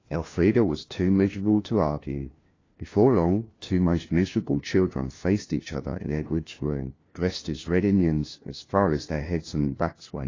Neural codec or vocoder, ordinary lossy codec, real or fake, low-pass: codec, 16 kHz, 1 kbps, FunCodec, trained on LibriTTS, 50 frames a second; AAC, 32 kbps; fake; 7.2 kHz